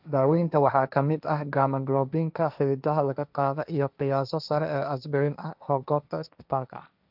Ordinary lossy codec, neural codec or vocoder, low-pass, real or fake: none; codec, 16 kHz, 1.1 kbps, Voila-Tokenizer; 5.4 kHz; fake